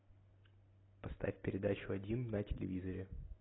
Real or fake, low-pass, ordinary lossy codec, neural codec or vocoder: real; 7.2 kHz; AAC, 16 kbps; none